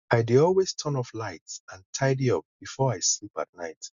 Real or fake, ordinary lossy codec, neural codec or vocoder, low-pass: real; none; none; 7.2 kHz